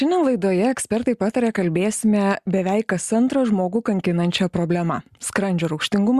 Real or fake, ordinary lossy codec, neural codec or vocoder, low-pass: real; Opus, 64 kbps; none; 14.4 kHz